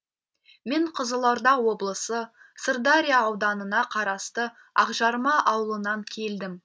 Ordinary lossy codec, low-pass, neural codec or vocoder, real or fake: none; none; none; real